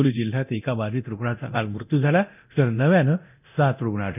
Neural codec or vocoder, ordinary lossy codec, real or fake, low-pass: codec, 24 kHz, 0.9 kbps, DualCodec; none; fake; 3.6 kHz